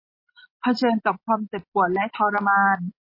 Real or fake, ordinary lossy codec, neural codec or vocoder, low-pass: real; MP3, 24 kbps; none; 5.4 kHz